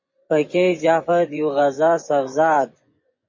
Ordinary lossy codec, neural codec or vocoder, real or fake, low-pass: MP3, 32 kbps; vocoder, 24 kHz, 100 mel bands, Vocos; fake; 7.2 kHz